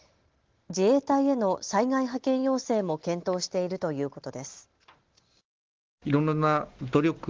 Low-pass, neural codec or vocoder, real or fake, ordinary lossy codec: 7.2 kHz; none; real; Opus, 16 kbps